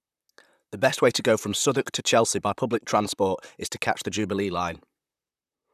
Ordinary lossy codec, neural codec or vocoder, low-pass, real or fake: none; vocoder, 44.1 kHz, 128 mel bands, Pupu-Vocoder; 14.4 kHz; fake